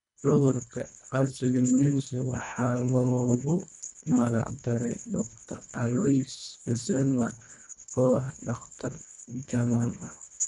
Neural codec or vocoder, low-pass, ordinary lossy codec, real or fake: codec, 24 kHz, 1.5 kbps, HILCodec; 10.8 kHz; none; fake